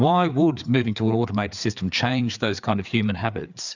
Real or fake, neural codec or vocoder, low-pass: fake; codec, 16 kHz, 4 kbps, FreqCodec, larger model; 7.2 kHz